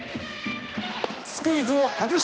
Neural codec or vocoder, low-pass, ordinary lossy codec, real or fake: codec, 16 kHz, 1 kbps, X-Codec, HuBERT features, trained on balanced general audio; none; none; fake